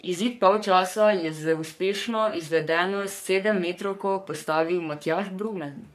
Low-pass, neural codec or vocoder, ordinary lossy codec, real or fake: 14.4 kHz; codec, 44.1 kHz, 3.4 kbps, Pupu-Codec; none; fake